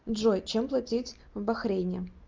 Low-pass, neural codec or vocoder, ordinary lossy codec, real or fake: 7.2 kHz; none; Opus, 32 kbps; real